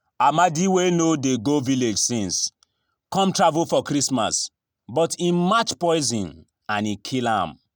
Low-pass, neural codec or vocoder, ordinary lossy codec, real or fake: none; none; none; real